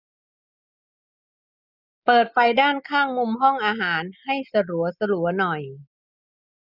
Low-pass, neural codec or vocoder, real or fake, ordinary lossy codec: 5.4 kHz; none; real; none